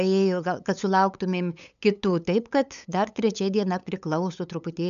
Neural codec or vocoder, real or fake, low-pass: codec, 16 kHz, 8 kbps, FunCodec, trained on LibriTTS, 25 frames a second; fake; 7.2 kHz